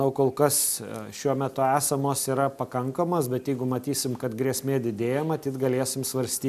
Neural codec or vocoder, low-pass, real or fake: none; 14.4 kHz; real